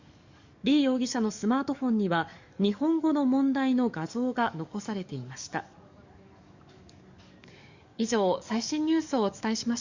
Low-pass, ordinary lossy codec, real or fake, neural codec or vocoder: 7.2 kHz; Opus, 64 kbps; fake; codec, 44.1 kHz, 7.8 kbps, DAC